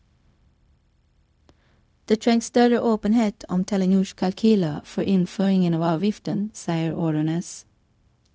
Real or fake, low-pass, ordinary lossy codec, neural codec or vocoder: fake; none; none; codec, 16 kHz, 0.4 kbps, LongCat-Audio-Codec